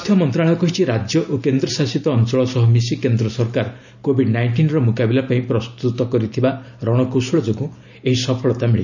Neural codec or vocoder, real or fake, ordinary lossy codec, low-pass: none; real; none; 7.2 kHz